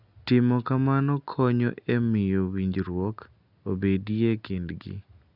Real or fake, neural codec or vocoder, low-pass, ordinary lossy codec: real; none; 5.4 kHz; none